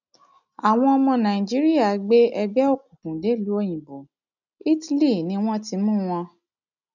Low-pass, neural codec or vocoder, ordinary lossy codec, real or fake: 7.2 kHz; none; none; real